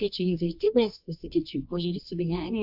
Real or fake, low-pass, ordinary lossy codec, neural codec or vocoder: fake; 5.4 kHz; AAC, 48 kbps; codec, 24 kHz, 0.9 kbps, WavTokenizer, medium music audio release